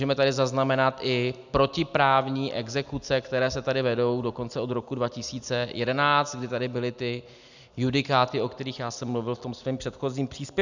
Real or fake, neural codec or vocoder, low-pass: real; none; 7.2 kHz